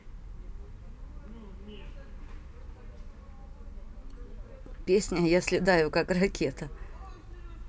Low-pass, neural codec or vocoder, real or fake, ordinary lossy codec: none; none; real; none